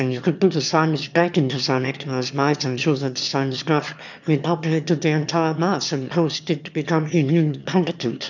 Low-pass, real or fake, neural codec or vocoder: 7.2 kHz; fake; autoencoder, 22.05 kHz, a latent of 192 numbers a frame, VITS, trained on one speaker